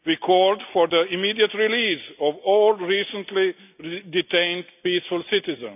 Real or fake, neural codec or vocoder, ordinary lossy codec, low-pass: real; none; none; 3.6 kHz